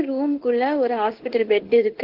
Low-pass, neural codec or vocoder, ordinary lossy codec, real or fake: 5.4 kHz; codec, 16 kHz in and 24 kHz out, 1 kbps, XY-Tokenizer; Opus, 16 kbps; fake